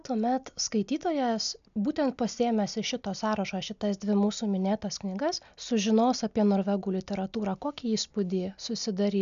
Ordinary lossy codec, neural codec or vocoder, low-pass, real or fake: MP3, 96 kbps; none; 7.2 kHz; real